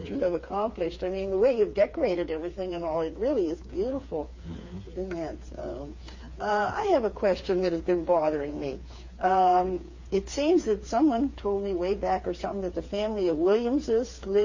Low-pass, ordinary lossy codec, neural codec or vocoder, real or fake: 7.2 kHz; MP3, 32 kbps; codec, 16 kHz, 4 kbps, FreqCodec, smaller model; fake